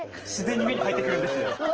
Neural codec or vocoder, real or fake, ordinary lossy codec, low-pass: none; real; Opus, 16 kbps; 7.2 kHz